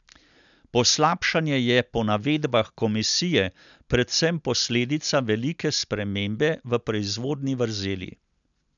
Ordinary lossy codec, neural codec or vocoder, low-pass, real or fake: none; none; 7.2 kHz; real